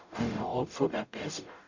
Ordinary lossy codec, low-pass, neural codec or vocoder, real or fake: Opus, 64 kbps; 7.2 kHz; codec, 44.1 kHz, 0.9 kbps, DAC; fake